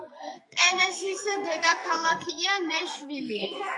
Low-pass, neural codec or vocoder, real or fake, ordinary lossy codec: 10.8 kHz; codec, 44.1 kHz, 2.6 kbps, SNAC; fake; MP3, 64 kbps